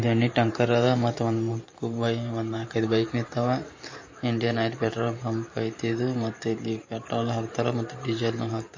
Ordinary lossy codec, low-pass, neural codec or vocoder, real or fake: MP3, 32 kbps; 7.2 kHz; vocoder, 44.1 kHz, 128 mel bands every 512 samples, BigVGAN v2; fake